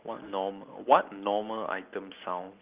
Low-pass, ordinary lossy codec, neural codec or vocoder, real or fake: 3.6 kHz; Opus, 24 kbps; none; real